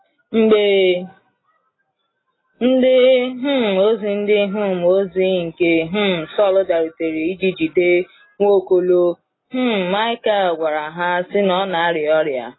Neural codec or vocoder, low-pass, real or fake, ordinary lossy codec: none; 7.2 kHz; real; AAC, 16 kbps